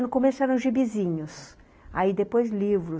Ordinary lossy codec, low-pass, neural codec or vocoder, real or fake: none; none; none; real